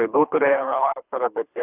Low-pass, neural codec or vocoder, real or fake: 3.6 kHz; codec, 24 kHz, 3 kbps, HILCodec; fake